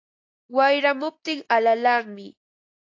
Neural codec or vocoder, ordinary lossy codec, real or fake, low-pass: none; AAC, 48 kbps; real; 7.2 kHz